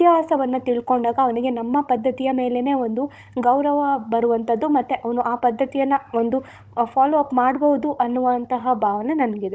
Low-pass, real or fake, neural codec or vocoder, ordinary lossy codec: none; fake; codec, 16 kHz, 16 kbps, FunCodec, trained on Chinese and English, 50 frames a second; none